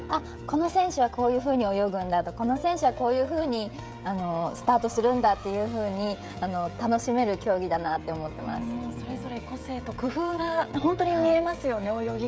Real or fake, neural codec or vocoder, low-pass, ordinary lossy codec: fake; codec, 16 kHz, 16 kbps, FreqCodec, smaller model; none; none